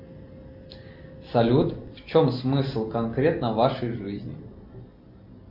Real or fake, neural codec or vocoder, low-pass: real; none; 5.4 kHz